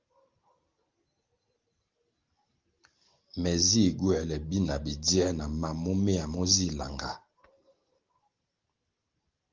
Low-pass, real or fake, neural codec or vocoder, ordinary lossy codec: 7.2 kHz; real; none; Opus, 24 kbps